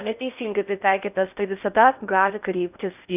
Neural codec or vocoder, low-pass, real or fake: codec, 16 kHz in and 24 kHz out, 0.6 kbps, FocalCodec, streaming, 4096 codes; 3.6 kHz; fake